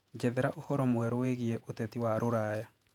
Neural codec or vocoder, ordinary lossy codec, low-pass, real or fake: vocoder, 48 kHz, 128 mel bands, Vocos; none; 19.8 kHz; fake